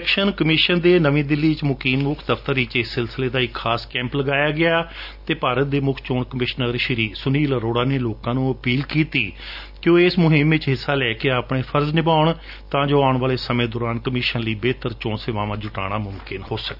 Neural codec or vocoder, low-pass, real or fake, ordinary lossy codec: none; 5.4 kHz; real; none